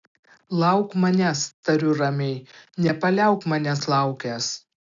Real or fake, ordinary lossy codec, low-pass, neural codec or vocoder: real; AAC, 64 kbps; 7.2 kHz; none